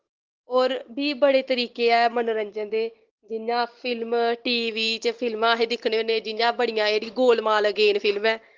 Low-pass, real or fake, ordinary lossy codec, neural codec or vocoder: 7.2 kHz; real; Opus, 16 kbps; none